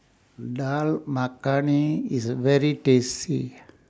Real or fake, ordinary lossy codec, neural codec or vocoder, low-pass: real; none; none; none